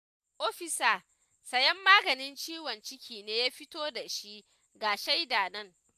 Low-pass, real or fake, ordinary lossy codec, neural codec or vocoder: 14.4 kHz; real; AAC, 96 kbps; none